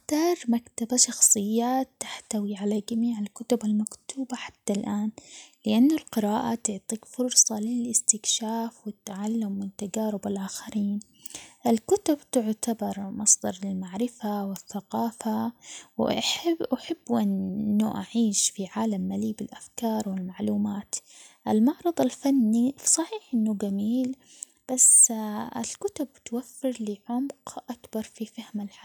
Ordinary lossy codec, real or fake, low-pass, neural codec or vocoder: none; real; none; none